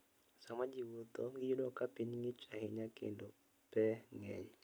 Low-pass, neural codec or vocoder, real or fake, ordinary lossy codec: none; none; real; none